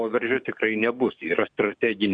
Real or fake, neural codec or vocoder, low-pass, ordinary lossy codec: fake; codec, 44.1 kHz, 7.8 kbps, DAC; 9.9 kHz; Opus, 64 kbps